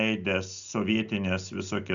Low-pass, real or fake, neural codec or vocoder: 7.2 kHz; real; none